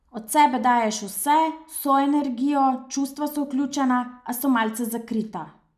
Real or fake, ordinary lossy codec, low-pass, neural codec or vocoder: real; none; 14.4 kHz; none